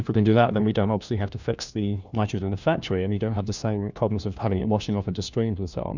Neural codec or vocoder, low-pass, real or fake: codec, 16 kHz, 1 kbps, FunCodec, trained on LibriTTS, 50 frames a second; 7.2 kHz; fake